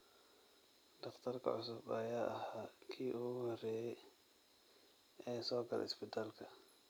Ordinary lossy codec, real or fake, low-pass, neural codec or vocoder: none; real; none; none